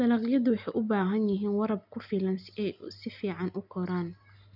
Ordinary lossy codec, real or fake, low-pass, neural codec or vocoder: none; real; 5.4 kHz; none